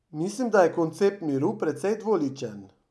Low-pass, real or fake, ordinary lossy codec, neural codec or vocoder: none; real; none; none